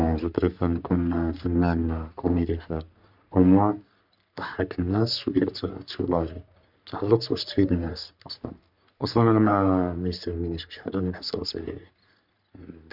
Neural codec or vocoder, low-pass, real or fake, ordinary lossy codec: codec, 44.1 kHz, 3.4 kbps, Pupu-Codec; 5.4 kHz; fake; none